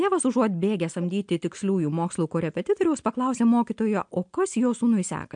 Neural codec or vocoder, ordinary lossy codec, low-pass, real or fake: none; MP3, 64 kbps; 9.9 kHz; real